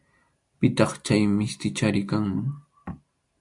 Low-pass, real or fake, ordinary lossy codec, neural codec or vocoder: 10.8 kHz; real; AAC, 64 kbps; none